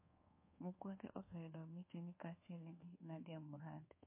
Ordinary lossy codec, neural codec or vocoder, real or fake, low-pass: MP3, 24 kbps; codec, 24 kHz, 1.2 kbps, DualCodec; fake; 3.6 kHz